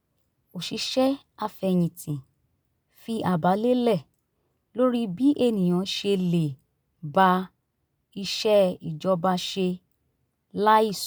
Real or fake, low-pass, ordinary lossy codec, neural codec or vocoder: real; none; none; none